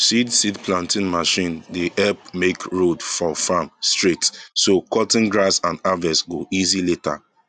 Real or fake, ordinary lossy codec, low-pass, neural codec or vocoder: real; none; 9.9 kHz; none